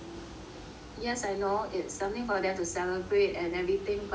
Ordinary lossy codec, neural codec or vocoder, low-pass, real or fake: none; none; none; real